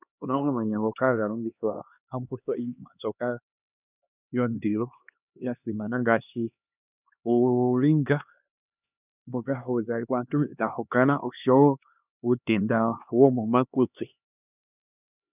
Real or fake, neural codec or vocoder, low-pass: fake; codec, 16 kHz, 2 kbps, X-Codec, HuBERT features, trained on LibriSpeech; 3.6 kHz